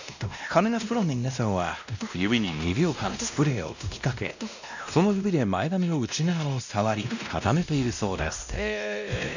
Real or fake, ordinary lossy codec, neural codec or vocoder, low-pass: fake; none; codec, 16 kHz, 1 kbps, X-Codec, WavLM features, trained on Multilingual LibriSpeech; 7.2 kHz